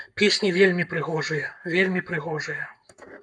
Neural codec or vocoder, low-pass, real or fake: codec, 44.1 kHz, 7.8 kbps, Pupu-Codec; 9.9 kHz; fake